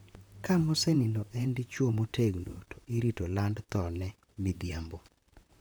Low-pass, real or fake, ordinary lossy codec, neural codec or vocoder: none; fake; none; vocoder, 44.1 kHz, 128 mel bands, Pupu-Vocoder